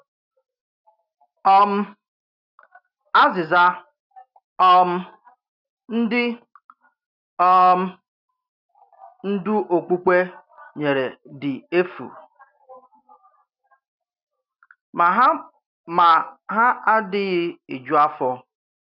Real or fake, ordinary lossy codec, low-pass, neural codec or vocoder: real; none; 5.4 kHz; none